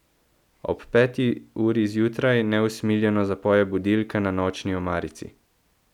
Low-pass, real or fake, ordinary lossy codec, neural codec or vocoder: 19.8 kHz; real; none; none